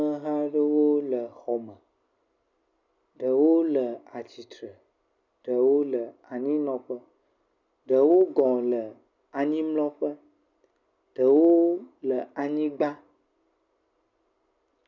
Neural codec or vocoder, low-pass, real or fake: none; 7.2 kHz; real